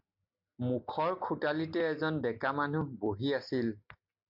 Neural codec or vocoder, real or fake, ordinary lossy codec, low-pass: none; real; MP3, 48 kbps; 5.4 kHz